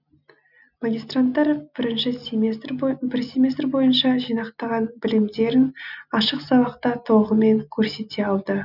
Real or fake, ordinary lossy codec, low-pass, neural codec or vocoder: real; none; 5.4 kHz; none